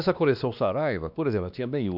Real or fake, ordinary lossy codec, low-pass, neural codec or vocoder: fake; none; 5.4 kHz; codec, 16 kHz, 2 kbps, X-Codec, WavLM features, trained on Multilingual LibriSpeech